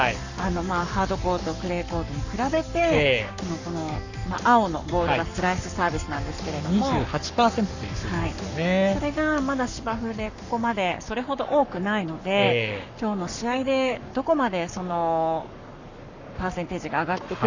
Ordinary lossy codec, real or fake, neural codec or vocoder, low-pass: none; fake; codec, 44.1 kHz, 7.8 kbps, Pupu-Codec; 7.2 kHz